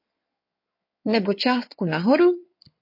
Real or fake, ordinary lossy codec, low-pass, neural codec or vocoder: fake; MP3, 32 kbps; 5.4 kHz; codec, 16 kHz in and 24 kHz out, 2.2 kbps, FireRedTTS-2 codec